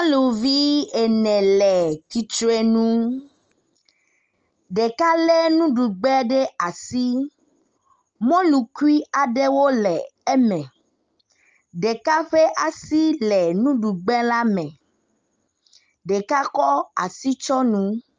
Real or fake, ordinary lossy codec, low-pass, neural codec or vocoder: real; Opus, 24 kbps; 7.2 kHz; none